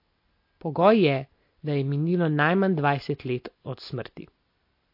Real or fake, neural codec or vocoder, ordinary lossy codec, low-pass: real; none; MP3, 32 kbps; 5.4 kHz